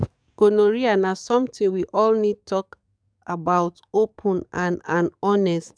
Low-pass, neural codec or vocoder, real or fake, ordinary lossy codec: 9.9 kHz; codec, 44.1 kHz, 7.8 kbps, DAC; fake; none